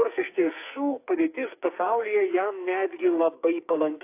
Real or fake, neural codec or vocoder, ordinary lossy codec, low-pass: fake; codec, 44.1 kHz, 2.6 kbps, SNAC; AAC, 24 kbps; 3.6 kHz